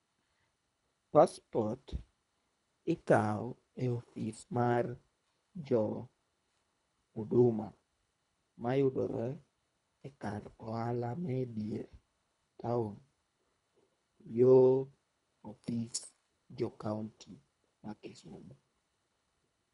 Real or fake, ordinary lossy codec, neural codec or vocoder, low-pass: fake; none; codec, 24 kHz, 3 kbps, HILCodec; 10.8 kHz